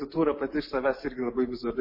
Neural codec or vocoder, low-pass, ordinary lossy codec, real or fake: none; 5.4 kHz; MP3, 24 kbps; real